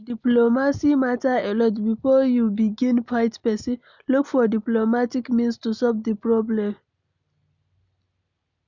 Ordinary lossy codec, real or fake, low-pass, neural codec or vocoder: none; real; 7.2 kHz; none